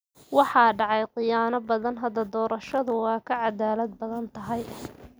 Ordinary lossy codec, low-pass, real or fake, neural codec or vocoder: none; none; real; none